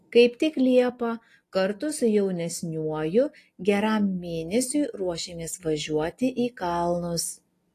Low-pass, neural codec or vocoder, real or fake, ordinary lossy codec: 14.4 kHz; none; real; AAC, 48 kbps